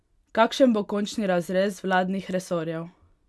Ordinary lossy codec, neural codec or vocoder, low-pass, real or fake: none; none; none; real